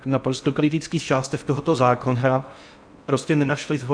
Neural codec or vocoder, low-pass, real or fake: codec, 16 kHz in and 24 kHz out, 0.6 kbps, FocalCodec, streaming, 4096 codes; 9.9 kHz; fake